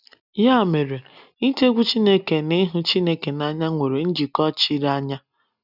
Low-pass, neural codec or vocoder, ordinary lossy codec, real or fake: 5.4 kHz; none; none; real